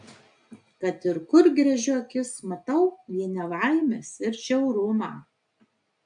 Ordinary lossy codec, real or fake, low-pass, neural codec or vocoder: MP3, 64 kbps; real; 9.9 kHz; none